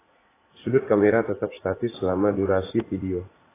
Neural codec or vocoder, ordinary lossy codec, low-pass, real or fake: vocoder, 44.1 kHz, 80 mel bands, Vocos; AAC, 16 kbps; 3.6 kHz; fake